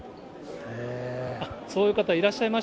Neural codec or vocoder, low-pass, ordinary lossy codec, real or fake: none; none; none; real